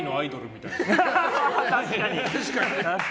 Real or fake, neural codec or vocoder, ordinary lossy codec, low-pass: real; none; none; none